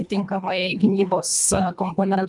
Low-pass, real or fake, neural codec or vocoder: 10.8 kHz; fake; codec, 24 kHz, 1.5 kbps, HILCodec